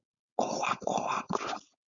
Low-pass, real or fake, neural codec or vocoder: 7.2 kHz; fake; codec, 16 kHz, 4.8 kbps, FACodec